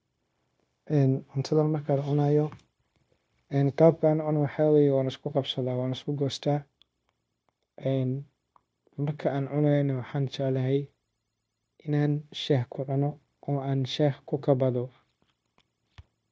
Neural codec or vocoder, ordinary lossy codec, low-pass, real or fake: codec, 16 kHz, 0.9 kbps, LongCat-Audio-Codec; none; none; fake